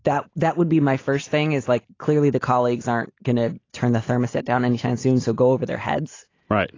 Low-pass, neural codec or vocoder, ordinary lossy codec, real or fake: 7.2 kHz; none; AAC, 32 kbps; real